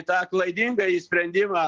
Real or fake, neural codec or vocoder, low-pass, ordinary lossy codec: real; none; 7.2 kHz; Opus, 16 kbps